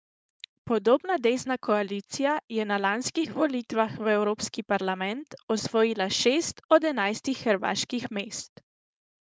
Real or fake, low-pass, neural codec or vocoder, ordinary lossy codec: fake; none; codec, 16 kHz, 4.8 kbps, FACodec; none